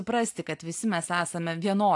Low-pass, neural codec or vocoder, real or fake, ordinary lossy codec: 10.8 kHz; none; real; AAC, 64 kbps